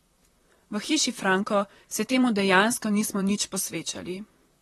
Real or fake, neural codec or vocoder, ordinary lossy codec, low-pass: fake; vocoder, 44.1 kHz, 128 mel bands, Pupu-Vocoder; AAC, 32 kbps; 19.8 kHz